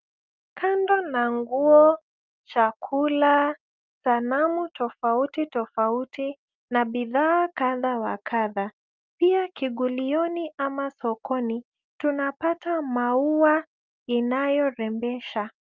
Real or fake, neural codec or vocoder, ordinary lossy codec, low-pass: real; none; Opus, 32 kbps; 7.2 kHz